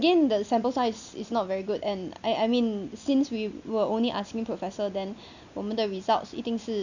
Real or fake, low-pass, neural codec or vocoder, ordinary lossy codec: real; 7.2 kHz; none; none